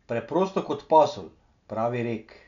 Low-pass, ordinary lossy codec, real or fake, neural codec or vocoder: 7.2 kHz; none; real; none